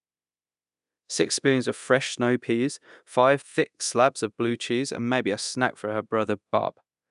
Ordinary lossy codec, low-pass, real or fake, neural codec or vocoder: none; 10.8 kHz; fake; codec, 24 kHz, 0.9 kbps, DualCodec